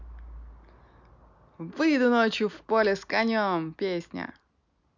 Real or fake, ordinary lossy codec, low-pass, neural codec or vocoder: real; none; 7.2 kHz; none